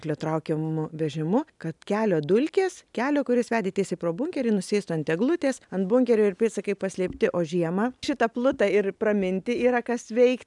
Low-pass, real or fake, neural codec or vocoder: 10.8 kHz; real; none